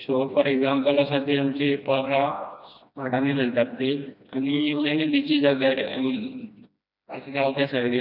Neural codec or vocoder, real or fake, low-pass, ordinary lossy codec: codec, 16 kHz, 1 kbps, FreqCodec, smaller model; fake; 5.4 kHz; none